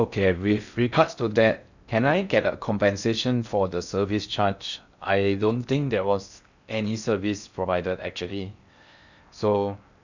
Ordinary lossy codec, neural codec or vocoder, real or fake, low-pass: none; codec, 16 kHz in and 24 kHz out, 0.6 kbps, FocalCodec, streaming, 4096 codes; fake; 7.2 kHz